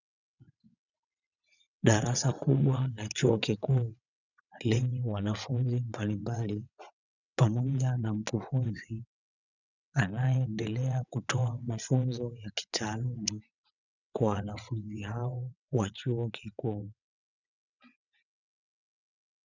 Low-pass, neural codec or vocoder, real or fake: 7.2 kHz; vocoder, 22.05 kHz, 80 mel bands, WaveNeXt; fake